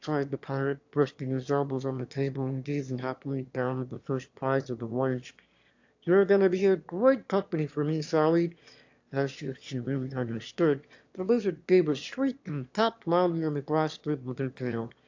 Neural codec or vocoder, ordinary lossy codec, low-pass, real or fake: autoencoder, 22.05 kHz, a latent of 192 numbers a frame, VITS, trained on one speaker; AAC, 48 kbps; 7.2 kHz; fake